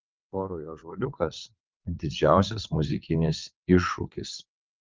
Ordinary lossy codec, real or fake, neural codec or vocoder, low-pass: Opus, 24 kbps; fake; vocoder, 22.05 kHz, 80 mel bands, WaveNeXt; 7.2 kHz